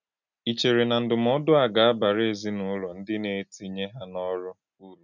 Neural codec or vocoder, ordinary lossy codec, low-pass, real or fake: none; none; 7.2 kHz; real